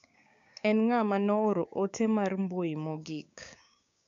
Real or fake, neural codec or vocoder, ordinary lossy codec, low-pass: fake; codec, 16 kHz, 6 kbps, DAC; AAC, 64 kbps; 7.2 kHz